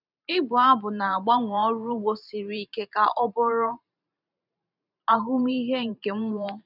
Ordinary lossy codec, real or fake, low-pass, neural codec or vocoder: none; fake; 5.4 kHz; vocoder, 44.1 kHz, 128 mel bands every 512 samples, BigVGAN v2